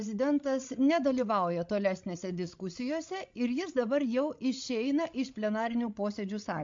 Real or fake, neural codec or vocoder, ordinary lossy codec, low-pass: fake; codec, 16 kHz, 16 kbps, FreqCodec, larger model; MP3, 48 kbps; 7.2 kHz